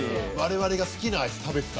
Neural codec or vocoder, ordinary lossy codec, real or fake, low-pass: none; none; real; none